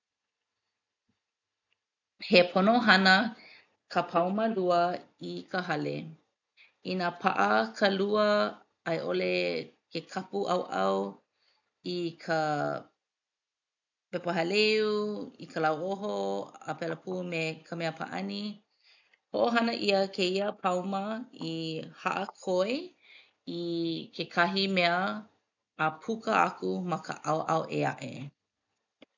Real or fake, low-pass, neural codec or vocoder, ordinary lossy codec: real; 7.2 kHz; none; none